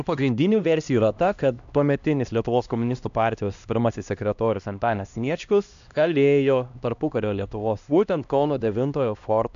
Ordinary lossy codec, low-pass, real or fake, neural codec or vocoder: AAC, 96 kbps; 7.2 kHz; fake; codec, 16 kHz, 1 kbps, X-Codec, HuBERT features, trained on LibriSpeech